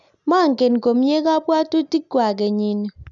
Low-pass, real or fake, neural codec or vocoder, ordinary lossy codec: 7.2 kHz; real; none; none